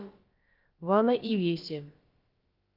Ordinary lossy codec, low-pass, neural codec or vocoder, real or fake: Opus, 32 kbps; 5.4 kHz; codec, 16 kHz, about 1 kbps, DyCAST, with the encoder's durations; fake